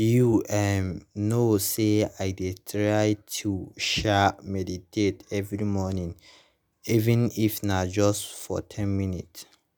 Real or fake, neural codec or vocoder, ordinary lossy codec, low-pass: real; none; none; none